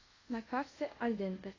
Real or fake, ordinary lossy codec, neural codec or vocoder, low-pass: fake; AAC, 32 kbps; codec, 24 kHz, 0.5 kbps, DualCodec; 7.2 kHz